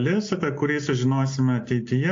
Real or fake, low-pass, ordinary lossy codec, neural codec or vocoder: real; 7.2 kHz; AAC, 48 kbps; none